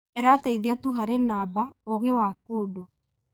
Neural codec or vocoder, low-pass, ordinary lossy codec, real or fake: codec, 44.1 kHz, 2.6 kbps, SNAC; none; none; fake